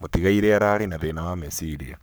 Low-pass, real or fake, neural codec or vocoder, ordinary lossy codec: none; fake; codec, 44.1 kHz, 7.8 kbps, Pupu-Codec; none